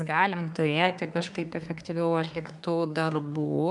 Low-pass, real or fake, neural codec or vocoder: 10.8 kHz; fake; codec, 24 kHz, 1 kbps, SNAC